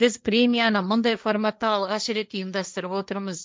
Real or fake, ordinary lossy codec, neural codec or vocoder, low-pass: fake; none; codec, 16 kHz, 1.1 kbps, Voila-Tokenizer; none